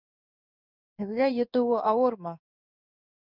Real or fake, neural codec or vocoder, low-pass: fake; codec, 16 kHz in and 24 kHz out, 1 kbps, XY-Tokenizer; 5.4 kHz